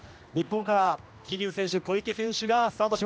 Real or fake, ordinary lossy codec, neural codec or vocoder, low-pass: fake; none; codec, 16 kHz, 1 kbps, X-Codec, HuBERT features, trained on general audio; none